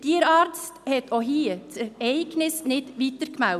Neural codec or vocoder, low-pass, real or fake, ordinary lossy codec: none; 14.4 kHz; real; none